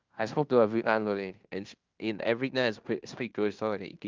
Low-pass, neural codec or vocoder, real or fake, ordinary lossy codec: 7.2 kHz; codec, 16 kHz in and 24 kHz out, 0.9 kbps, LongCat-Audio-Codec, fine tuned four codebook decoder; fake; Opus, 24 kbps